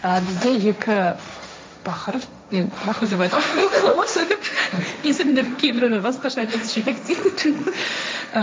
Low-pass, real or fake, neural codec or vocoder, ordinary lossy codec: none; fake; codec, 16 kHz, 1.1 kbps, Voila-Tokenizer; none